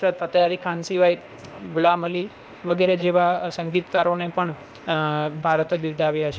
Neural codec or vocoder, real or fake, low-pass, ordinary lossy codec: codec, 16 kHz, 0.8 kbps, ZipCodec; fake; none; none